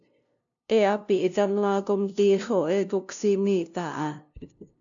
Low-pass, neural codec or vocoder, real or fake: 7.2 kHz; codec, 16 kHz, 0.5 kbps, FunCodec, trained on LibriTTS, 25 frames a second; fake